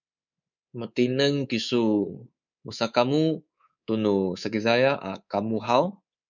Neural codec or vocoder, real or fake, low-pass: codec, 24 kHz, 3.1 kbps, DualCodec; fake; 7.2 kHz